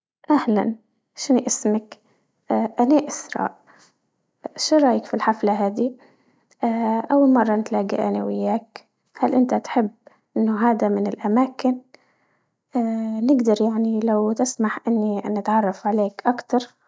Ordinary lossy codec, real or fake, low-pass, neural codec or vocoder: none; real; none; none